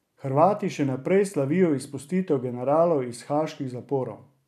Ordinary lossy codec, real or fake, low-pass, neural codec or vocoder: none; fake; 14.4 kHz; vocoder, 44.1 kHz, 128 mel bands every 256 samples, BigVGAN v2